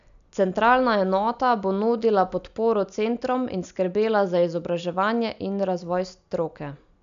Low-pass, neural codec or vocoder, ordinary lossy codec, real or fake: 7.2 kHz; none; AAC, 96 kbps; real